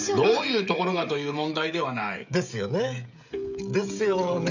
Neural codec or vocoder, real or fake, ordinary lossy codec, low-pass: codec, 16 kHz, 16 kbps, FreqCodec, smaller model; fake; none; 7.2 kHz